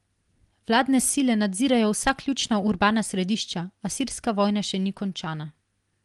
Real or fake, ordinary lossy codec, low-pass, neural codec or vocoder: real; Opus, 24 kbps; 10.8 kHz; none